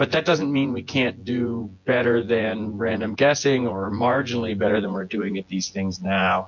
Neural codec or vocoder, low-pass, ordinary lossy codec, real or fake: vocoder, 24 kHz, 100 mel bands, Vocos; 7.2 kHz; MP3, 48 kbps; fake